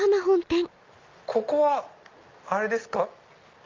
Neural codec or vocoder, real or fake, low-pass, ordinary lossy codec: none; real; 7.2 kHz; Opus, 24 kbps